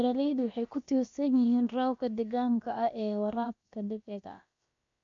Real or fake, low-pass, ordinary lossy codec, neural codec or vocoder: fake; 7.2 kHz; MP3, 48 kbps; codec, 16 kHz, about 1 kbps, DyCAST, with the encoder's durations